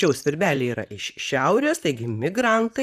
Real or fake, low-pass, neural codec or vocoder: fake; 14.4 kHz; vocoder, 44.1 kHz, 128 mel bands, Pupu-Vocoder